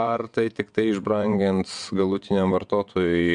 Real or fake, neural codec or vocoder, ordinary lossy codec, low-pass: fake; vocoder, 22.05 kHz, 80 mel bands, WaveNeXt; MP3, 96 kbps; 9.9 kHz